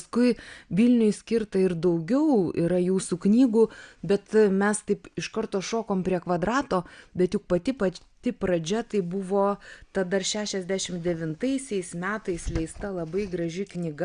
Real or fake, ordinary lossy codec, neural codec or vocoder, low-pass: real; Opus, 64 kbps; none; 9.9 kHz